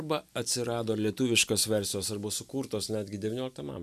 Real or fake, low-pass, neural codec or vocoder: real; 14.4 kHz; none